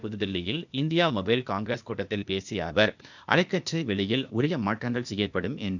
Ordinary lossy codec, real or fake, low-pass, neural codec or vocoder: none; fake; 7.2 kHz; codec, 16 kHz, 0.8 kbps, ZipCodec